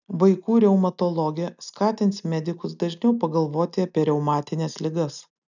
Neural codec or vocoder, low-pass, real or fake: none; 7.2 kHz; real